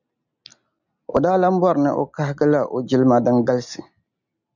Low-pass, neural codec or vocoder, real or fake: 7.2 kHz; none; real